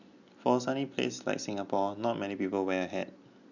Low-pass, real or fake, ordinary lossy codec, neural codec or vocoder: 7.2 kHz; real; none; none